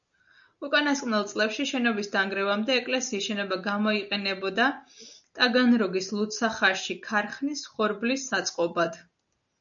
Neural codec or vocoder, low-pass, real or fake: none; 7.2 kHz; real